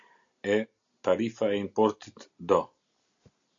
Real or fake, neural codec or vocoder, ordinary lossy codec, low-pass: real; none; AAC, 64 kbps; 7.2 kHz